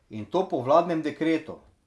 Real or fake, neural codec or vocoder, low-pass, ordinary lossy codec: real; none; none; none